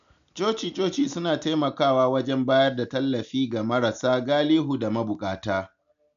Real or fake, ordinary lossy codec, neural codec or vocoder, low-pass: real; none; none; 7.2 kHz